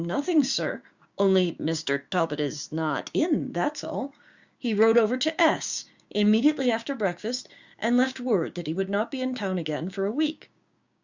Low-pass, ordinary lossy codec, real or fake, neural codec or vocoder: 7.2 kHz; Opus, 64 kbps; fake; codec, 16 kHz, 6 kbps, DAC